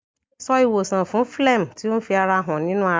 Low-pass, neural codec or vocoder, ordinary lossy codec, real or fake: none; none; none; real